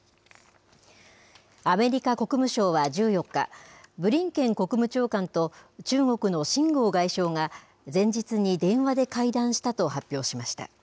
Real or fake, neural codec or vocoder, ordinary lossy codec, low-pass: real; none; none; none